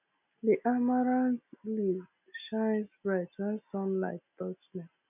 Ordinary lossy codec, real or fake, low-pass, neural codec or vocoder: none; real; 3.6 kHz; none